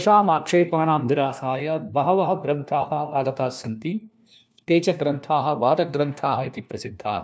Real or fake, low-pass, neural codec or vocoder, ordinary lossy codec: fake; none; codec, 16 kHz, 1 kbps, FunCodec, trained on LibriTTS, 50 frames a second; none